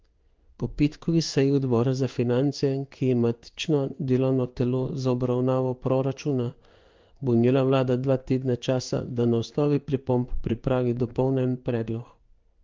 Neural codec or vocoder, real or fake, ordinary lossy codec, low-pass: codec, 16 kHz in and 24 kHz out, 1 kbps, XY-Tokenizer; fake; Opus, 32 kbps; 7.2 kHz